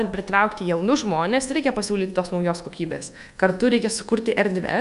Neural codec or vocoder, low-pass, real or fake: codec, 24 kHz, 1.2 kbps, DualCodec; 10.8 kHz; fake